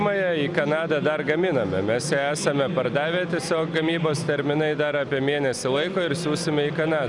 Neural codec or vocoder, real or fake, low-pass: none; real; 10.8 kHz